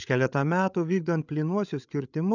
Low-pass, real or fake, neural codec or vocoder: 7.2 kHz; fake; codec, 16 kHz, 8 kbps, FreqCodec, larger model